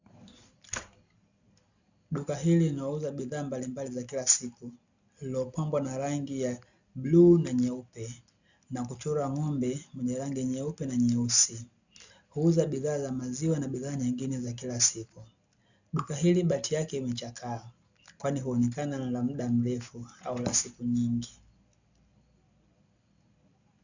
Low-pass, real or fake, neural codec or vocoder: 7.2 kHz; real; none